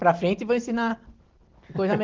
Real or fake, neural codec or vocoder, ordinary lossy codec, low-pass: real; none; Opus, 16 kbps; 7.2 kHz